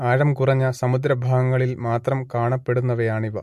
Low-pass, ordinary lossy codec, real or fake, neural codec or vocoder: 14.4 kHz; MP3, 64 kbps; real; none